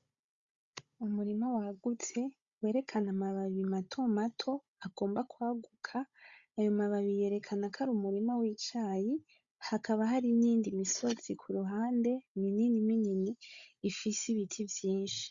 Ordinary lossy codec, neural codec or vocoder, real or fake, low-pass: Opus, 64 kbps; codec, 16 kHz, 16 kbps, FunCodec, trained on Chinese and English, 50 frames a second; fake; 7.2 kHz